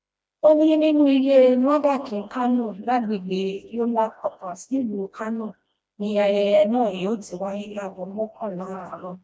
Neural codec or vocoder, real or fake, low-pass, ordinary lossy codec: codec, 16 kHz, 1 kbps, FreqCodec, smaller model; fake; none; none